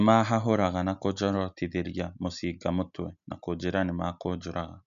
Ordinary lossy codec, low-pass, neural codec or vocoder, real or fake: none; 7.2 kHz; none; real